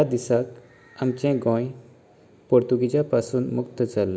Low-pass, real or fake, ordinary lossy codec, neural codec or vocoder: none; real; none; none